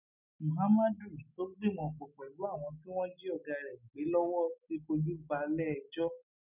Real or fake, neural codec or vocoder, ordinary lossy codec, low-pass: real; none; none; 3.6 kHz